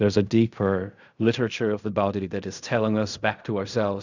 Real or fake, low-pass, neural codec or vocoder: fake; 7.2 kHz; codec, 16 kHz in and 24 kHz out, 0.4 kbps, LongCat-Audio-Codec, fine tuned four codebook decoder